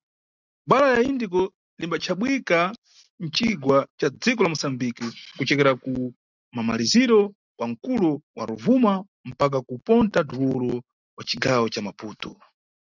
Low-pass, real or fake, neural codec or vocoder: 7.2 kHz; real; none